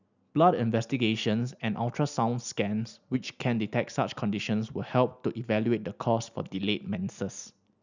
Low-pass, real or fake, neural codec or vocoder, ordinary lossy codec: 7.2 kHz; real; none; none